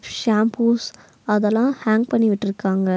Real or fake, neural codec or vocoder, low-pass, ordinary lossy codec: real; none; none; none